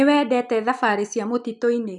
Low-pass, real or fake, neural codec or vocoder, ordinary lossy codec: 10.8 kHz; real; none; none